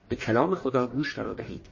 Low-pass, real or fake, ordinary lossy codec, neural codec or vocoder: 7.2 kHz; fake; MP3, 32 kbps; codec, 44.1 kHz, 1.7 kbps, Pupu-Codec